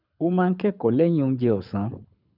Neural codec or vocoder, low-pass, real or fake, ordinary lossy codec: codec, 24 kHz, 6 kbps, HILCodec; 5.4 kHz; fake; none